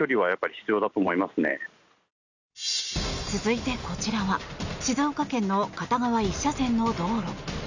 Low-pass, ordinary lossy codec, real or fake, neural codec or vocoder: 7.2 kHz; none; real; none